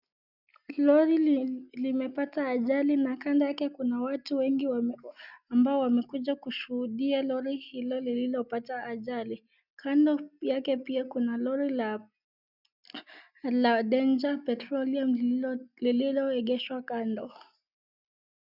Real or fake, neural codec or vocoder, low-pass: real; none; 5.4 kHz